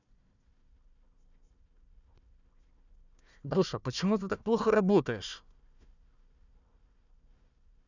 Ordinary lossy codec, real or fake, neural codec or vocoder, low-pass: none; fake; codec, 16 kHz, 1 kbps, FunCodec, trained on Chinese and English, 50 frames a second; 7.2 kHz